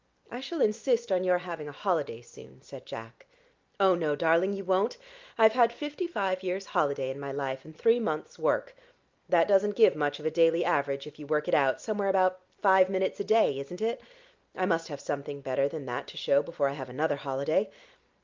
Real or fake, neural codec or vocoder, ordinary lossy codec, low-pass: real; none; Opus, 24 kbps; 7.2 kHz